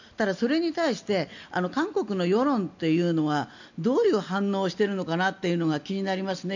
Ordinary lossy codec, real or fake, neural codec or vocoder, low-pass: none; real; none; 7.2 kHz